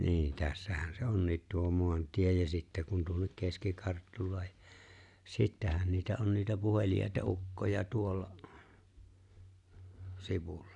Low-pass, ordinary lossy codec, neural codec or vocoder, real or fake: 10.8 kHz; none; none; real